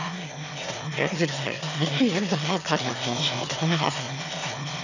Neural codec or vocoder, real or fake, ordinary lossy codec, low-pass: autoencoder, 22.05 kHz, a latent of 192 numbers a frame, VITS, trained on one speaker; fake; none; 7.2 kHz